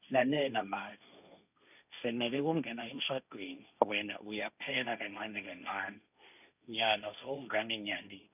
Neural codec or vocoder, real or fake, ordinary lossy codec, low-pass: codec, 16 kHz, 1.1 kbps, Voila-Tokenizer; fake; none; 3.6 kHz